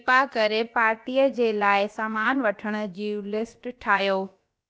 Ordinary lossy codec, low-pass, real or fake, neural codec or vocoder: none; none; fake; codec, 16 kHz, about 1 kbps, DyCAST, with the encoder's durations